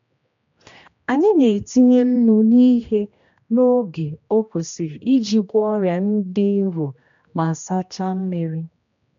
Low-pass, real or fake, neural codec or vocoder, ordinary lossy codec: 7.2 kHz; fake; codec, 16 kHz, 1 kbps, X-Codec, HuBERT features, trained on general audio; MP3, 64 kbps